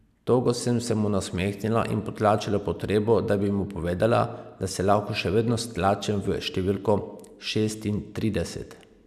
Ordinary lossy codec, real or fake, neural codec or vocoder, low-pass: none; real; none; 14.4 kHz